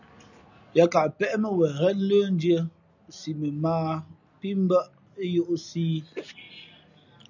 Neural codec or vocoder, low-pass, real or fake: none; 7.2 kHz; real